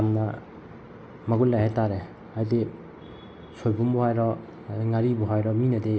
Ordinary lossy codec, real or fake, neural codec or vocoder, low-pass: none; real; none; none